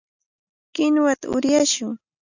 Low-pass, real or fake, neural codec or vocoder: 7.2 kHz; real; none